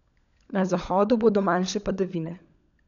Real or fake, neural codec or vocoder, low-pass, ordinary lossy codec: fake; codec, 16 kHz, 16 kbps, FunCodec, trained on LibriTTS, 50 frames a second; 7.2 kHz; none